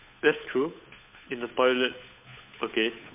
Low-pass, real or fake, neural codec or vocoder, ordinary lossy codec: 3.6 kHz; fake; codec, 16 kHz, 8 kbps, FunCodec, trained on Chinese and English, 25 frames a second; MP3, 32 kbps